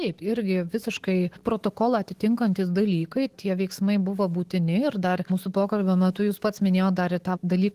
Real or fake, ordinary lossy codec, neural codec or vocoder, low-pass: fake; Opus, 16 kbps; autoencoder, 48 kHz, 128 numbers a frame, DAC-VAE, trained on Japanese speech; 14.4 kHz